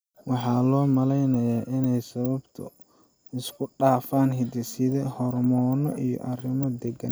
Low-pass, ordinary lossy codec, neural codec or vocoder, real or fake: none; none; none; real